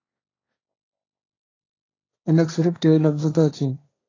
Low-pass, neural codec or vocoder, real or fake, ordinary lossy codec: 7.2 kHz; codec, 16 kHz, 1.1 kbps, Voila-Tokenizer; fake; AAC, 32 kbps